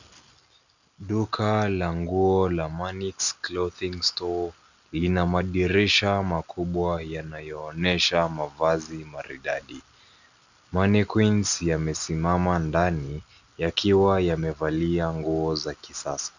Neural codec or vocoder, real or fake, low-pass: none; real; 7.2 kHz